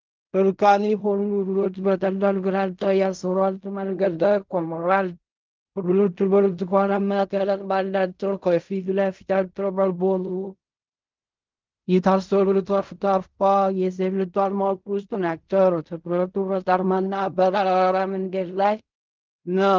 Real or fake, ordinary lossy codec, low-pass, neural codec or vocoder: fake; Opus, 24 kbps; 7.2 kHz; codec, 16 kHz in and 24 kHz out, 0.4 kbps, LongCat-Audio-Codec, fine tuned four codebook decoder